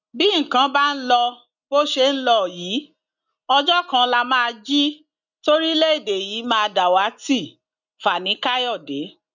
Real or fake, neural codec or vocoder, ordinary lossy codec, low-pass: real; none; none; 7.2 kHz